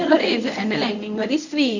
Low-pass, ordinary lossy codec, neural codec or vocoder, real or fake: 7.2 kHz; none; codec, 24 kHz, 0.9 kbps, WavTokenizer, medium speech release version 1; fake